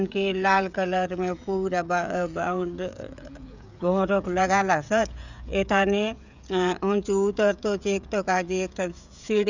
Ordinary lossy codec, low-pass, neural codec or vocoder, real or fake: none; 7.2 kHz; codec, 16 kHz, 16 kbps, FreqCodec, smaller model; fake